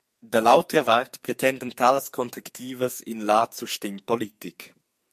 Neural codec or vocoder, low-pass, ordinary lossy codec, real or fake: codec, 44.1 kHz, 2.6 kbps, SNAC; 14.4 kHz; MP3, 64 kbps; fake